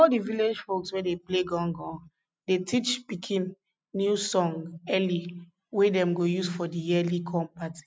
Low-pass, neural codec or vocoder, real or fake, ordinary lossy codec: none; none; real; none